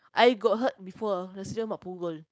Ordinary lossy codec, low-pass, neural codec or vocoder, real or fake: none; none; codec, 16 kHz, 4.8 kbps, FACodec; fake